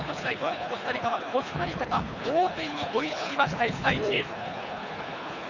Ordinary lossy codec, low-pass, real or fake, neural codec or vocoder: none; 7.2 kHz; fake; codec, 24 kHz, 3 kbps, HILCodec